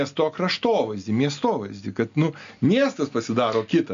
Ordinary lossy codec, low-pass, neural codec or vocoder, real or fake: MP3, 64 kbps; 7.2 kHz; none; real